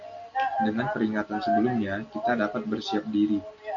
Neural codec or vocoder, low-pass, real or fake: none; 7.2 kHz; real